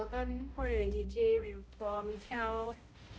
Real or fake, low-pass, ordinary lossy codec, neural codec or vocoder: fake; none; none; codec, 16 kHz, 0.5 kbps, X-Codec, HuBERT features, trained on balanced general audio